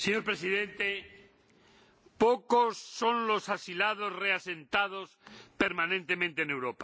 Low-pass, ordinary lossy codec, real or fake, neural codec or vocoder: none; none; real; none